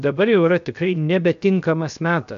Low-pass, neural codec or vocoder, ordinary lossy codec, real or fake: 7.2 kHz; codec, 16 kHz, 0.7 kbps, FocalCodec; AAC, 96 kbps; fake